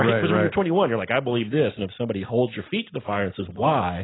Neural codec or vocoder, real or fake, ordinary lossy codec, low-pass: none; real; AAC, 16 kbps; 7.2 kHz